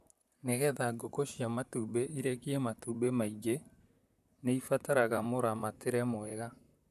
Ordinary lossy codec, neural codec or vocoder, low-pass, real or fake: none; vocoder, 44.1 kHz, 128 mel bands, Pupu-Vocoder; 14.4 kHz; fake